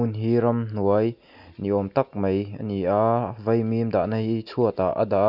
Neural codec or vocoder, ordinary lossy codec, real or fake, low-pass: none; none; real; 5.4 kHz